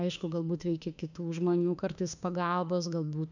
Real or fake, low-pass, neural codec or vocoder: fake; 7.2 kHz; autoencoder, 48 kHz, 32 numbers a frame, DAC-VAE, trained on Japanese speech